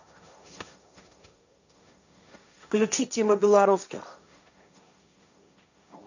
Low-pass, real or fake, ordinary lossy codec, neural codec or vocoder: 7.2 kHz; fake; none; codec, 16 kHz, 1.1 kbps, Voila-Tokenizer